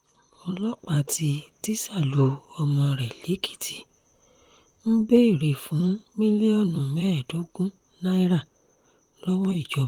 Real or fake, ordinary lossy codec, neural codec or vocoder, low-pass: fake; Opus, 24 kbps; vocoder, 44.1 kHz, 128 mel bands, Pupu-Vocoder; 19.8 kHz